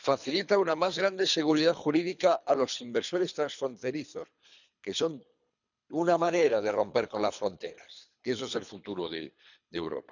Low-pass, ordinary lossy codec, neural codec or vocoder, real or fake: 7.2 kHz; none; codec, 24 kHz, 3 kbps, HILCodec; fake